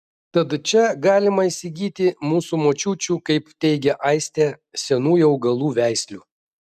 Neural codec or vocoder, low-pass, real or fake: none; 14.4 kHz; real